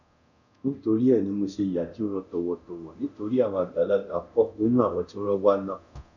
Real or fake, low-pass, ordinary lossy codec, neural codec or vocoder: fake; 7.2 kHz; none; codec, 24 kHz, 0.9 kbps, DualCodec